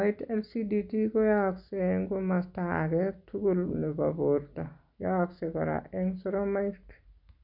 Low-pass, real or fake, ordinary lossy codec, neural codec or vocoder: 5.4 kHz; real; none; none